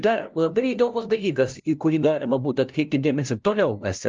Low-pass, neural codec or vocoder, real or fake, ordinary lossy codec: 7.2 kHz; codec, 16 kHz, 0.5 kbps, FunCodec, trained on LibriTTS, 25 frames a second; fake; Opus, 24 kbps